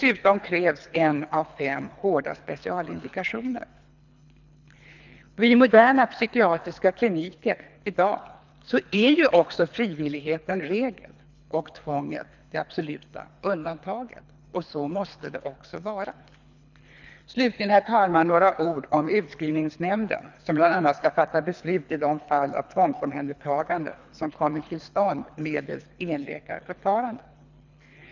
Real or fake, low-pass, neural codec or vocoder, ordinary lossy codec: fake; 7.2 kHz; codec, 24 kHz, 3 kbps, HILCodec; none